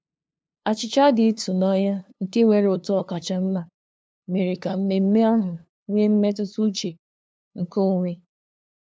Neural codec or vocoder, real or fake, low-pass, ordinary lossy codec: codec, 16 kHz, 2 kbps, FunCodec, trained on LibriTTS, 25 frames a second; fake; none; none